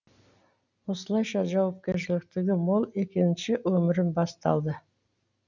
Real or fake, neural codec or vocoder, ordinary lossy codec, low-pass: real; none; none; 7.2 kHz